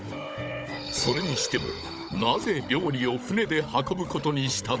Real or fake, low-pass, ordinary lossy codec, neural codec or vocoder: fake; none; none; codec, 16 kHz, 16 kbps, FunCodec, trained on Chinese and English, 50 frames a second